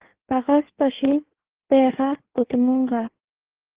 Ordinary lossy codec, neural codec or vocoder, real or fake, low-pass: Opus, 16 kbps; codec, 16 kHz in and 24 kHz out, 1.1 kbps, FireRedTTS-2 codec; fake; 3.6 kHz